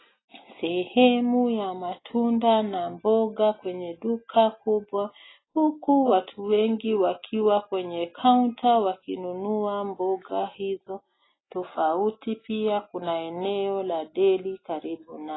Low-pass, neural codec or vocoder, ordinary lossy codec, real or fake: 7.2 kHz; none; AAC, 16 kbps; real